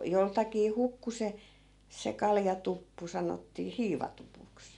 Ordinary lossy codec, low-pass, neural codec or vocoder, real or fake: none; 10.8 kHz; none; real